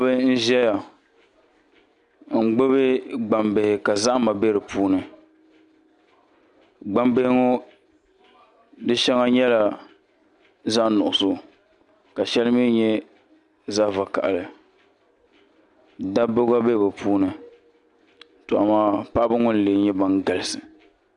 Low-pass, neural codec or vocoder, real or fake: 10.8 kHz; none; real